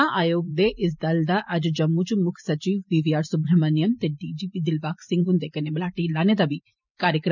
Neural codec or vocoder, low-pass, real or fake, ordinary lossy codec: vocoder, 44.1 kHz, 128 mel bands every 512 samples, BigVGAN v2; 7.2 kHz; fake; none